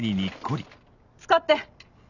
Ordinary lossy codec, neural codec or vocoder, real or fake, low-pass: none; none; real; 7.2 kHz